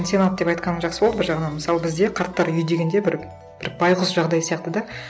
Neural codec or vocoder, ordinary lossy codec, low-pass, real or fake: none; none; none; real